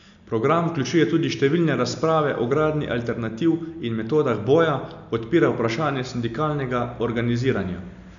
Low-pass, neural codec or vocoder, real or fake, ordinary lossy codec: 7.2 kHz; none; real; none